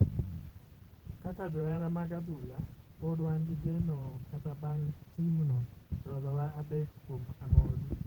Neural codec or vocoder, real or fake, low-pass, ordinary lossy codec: vocoder, 44.1 kHz, 128 mel bands every 512 samples, BigVGAN v2; fake; 19.8 kHz; Opus, 16 kbps